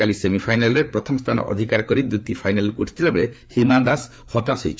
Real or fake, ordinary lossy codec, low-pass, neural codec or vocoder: fake; none; none; codec, 16 kHz, 4 kbps, FreqCodec, larger model